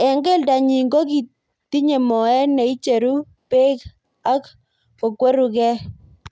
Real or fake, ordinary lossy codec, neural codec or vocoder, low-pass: real; none; none; none